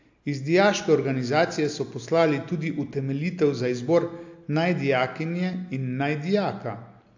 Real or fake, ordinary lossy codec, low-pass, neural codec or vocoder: real; AAC, 48 kbps; 7.2 kHz; none